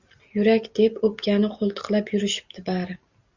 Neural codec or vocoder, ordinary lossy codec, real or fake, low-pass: none; Opus, 64 kbps; real; 7.2 kHz